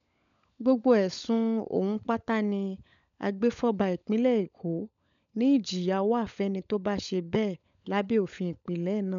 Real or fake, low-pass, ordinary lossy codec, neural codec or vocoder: fake; 7.2 kHz; none; codec, 16 kHz, 16 kbps, FunCodec, trained on LibriTTS, 50 frames a second